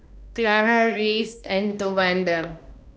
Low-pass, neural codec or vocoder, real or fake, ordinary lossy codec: none; codec, 16 kHz, 1 kbps, X-Codec, HuBERT features, trained on balanced general audio; fake; none